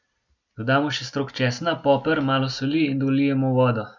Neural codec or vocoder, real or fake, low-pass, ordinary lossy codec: none; real; 7.2 kHz; none